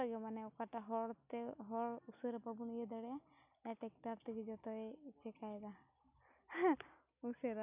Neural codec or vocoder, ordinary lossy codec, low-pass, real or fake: none; none; 3.6 kHz; real